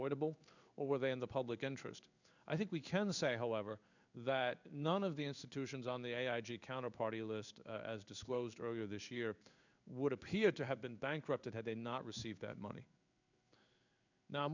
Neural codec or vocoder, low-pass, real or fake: codec, 16 kHz in and 24 kHz out, 1 kbps, XY-Tokenizer; 7.2 kHz; fake